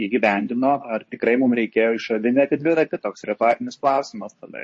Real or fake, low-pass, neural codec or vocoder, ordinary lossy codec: fake; 10.8 kHz; codec, 24 kHz, 0.9 kbps, WavTokenizer, medium speech release version 2; MP3, 32 kbps